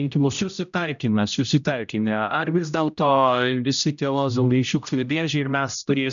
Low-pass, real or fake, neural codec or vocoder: 7.2 kHz; fake; codec, 16 kHz, 0.5 kbps, X-Codec, HuBERT features, trained on general audio